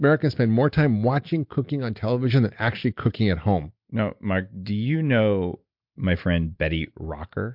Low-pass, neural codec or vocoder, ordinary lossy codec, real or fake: 5.4 kHz; none; MP3, 48 kbps; real